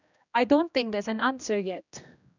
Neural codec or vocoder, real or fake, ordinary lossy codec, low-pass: codec, 16 kHz, 1 kbps, X-Codec, HuBERT features, trained on general audio; fake; none; 7.2 kHz